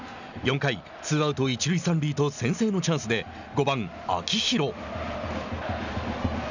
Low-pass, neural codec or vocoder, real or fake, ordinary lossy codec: 7.2 kHz; none; real; none